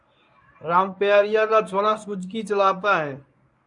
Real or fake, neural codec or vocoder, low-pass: fake; codec, 24 kHz, 0.9 kbps, WavTokenizer, medium speech release version 1; 10.8 kHz